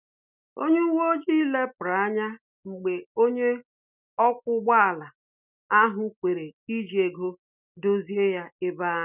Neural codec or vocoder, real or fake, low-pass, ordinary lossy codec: none; real; 3.6 kHz; none